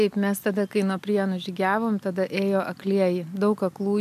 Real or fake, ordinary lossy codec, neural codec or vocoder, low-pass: real; MP3, 96 kbps; none; 14.4 kHz